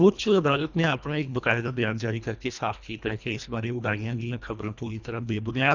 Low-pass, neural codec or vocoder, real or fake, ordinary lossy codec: 7.2 kHz; codec, 24 kHz, 1.5 kbps, HILCodec; fake; none